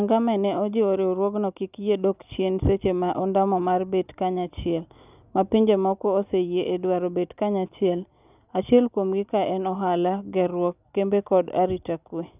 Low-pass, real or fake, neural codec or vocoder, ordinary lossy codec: 3.6 kHz; real; none; none